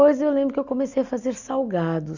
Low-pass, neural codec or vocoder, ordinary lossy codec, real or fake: 7.2 kHz; none; Opus, 64 kbps; real